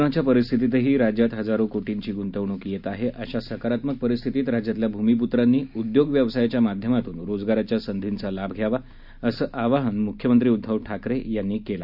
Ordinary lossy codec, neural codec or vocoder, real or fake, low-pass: none; none; real; 5.4 kHz